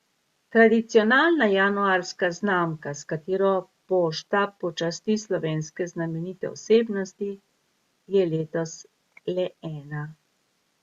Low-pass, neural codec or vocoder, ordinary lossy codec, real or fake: 14.4 kHz; none; Opus, 64 kbps; real